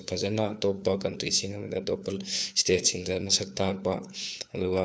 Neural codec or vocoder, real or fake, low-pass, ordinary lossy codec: codec, 16 kHz, 4 kbps, FunCodec, trained on LibriTTS, 50 frames a second; fake; none; none